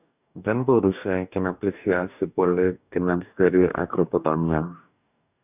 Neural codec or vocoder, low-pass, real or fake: codec, 44.1 kHz, 2.6 kbps, DAC; 3.6 kHz; fake